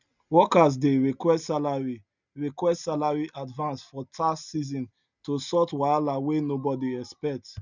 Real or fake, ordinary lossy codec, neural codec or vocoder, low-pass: real; none; none; 7.2 kHz